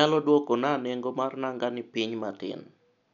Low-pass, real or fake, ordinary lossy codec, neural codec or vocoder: 7.2 kHz; real; none; none